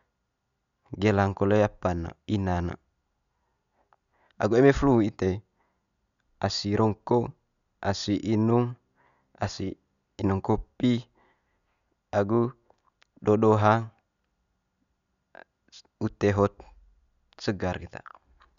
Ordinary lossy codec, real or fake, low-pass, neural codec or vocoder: none; real; 7.2 kHz; none